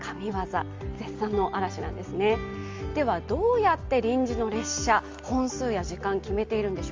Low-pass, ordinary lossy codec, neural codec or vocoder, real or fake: 7.2 kHz; Opus, 32 kbps; none; real